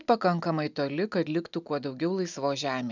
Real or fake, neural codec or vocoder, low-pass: real; none; 7.2 kHz